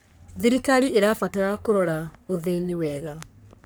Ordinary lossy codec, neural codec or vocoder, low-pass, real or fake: none; codec, 44.1 kHz, 3.4 kbps, Pupu-Codec; none; fake